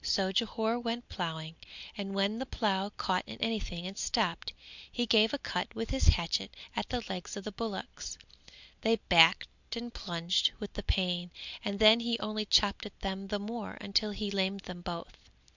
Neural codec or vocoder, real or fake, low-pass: none; real; 7.2 kHz